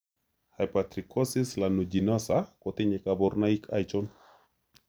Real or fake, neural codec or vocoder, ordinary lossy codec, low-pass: real; none; none; none